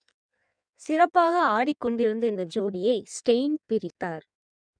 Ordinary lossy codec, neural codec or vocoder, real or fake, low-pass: none; codec, 16 kHz in and 24 kHz out, 1.1 kbps, FireRedTTS-2 codec; fake; 9.9 kHz